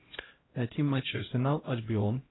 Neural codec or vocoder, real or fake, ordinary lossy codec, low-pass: codec, 16 kHz, 0.5 kbps, X-Codec, HuBERT features, trained on LibriSpeech; fake; AAC, 16 kbps; 7.2 kHz